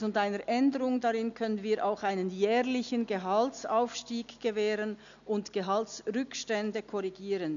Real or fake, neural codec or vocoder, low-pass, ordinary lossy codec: real; none; 7.2 kHz; none